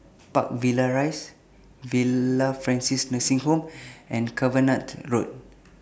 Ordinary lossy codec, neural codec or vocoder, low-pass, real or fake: none; none; none; real